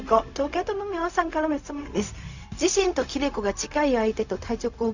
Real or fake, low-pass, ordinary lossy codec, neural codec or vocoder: fake; 7.2 kHz; none; codec, 16 kHz, 0.4 kbps, LongCat-Audio-Codec